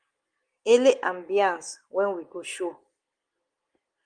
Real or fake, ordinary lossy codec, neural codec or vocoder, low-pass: real; Opus, 24 kbps; none; 9.9 kHz